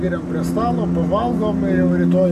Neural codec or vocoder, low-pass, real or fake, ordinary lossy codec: none; 14.4 kHz; real; MP3, 64 kbps